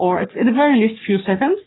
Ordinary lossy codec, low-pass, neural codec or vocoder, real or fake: AAC, 16 kbps; 7.2 kHz; codec, 44.1 kHz, 3.4 kbps, Pupu-Codec; fake